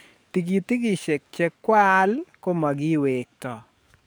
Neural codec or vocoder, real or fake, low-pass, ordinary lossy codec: codec, 44.1 kHz, 7.8 kbps, DAC; fake; none; none